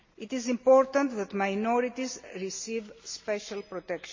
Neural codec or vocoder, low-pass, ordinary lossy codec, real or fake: none; 7.2 kHz; MP3, 32 kbps; real